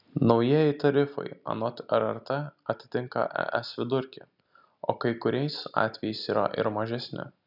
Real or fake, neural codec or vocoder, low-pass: real; none; 5.4 kHz